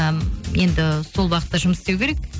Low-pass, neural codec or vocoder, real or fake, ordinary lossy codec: none; none; real; none